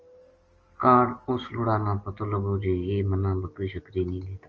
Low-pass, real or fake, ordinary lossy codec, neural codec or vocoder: 7.2 kHz; real; Opus, 24 kbps; none